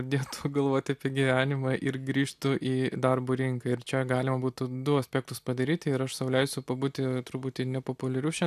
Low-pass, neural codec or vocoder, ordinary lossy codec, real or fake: 14.4 kHz; none; AAC, 96 kbps; real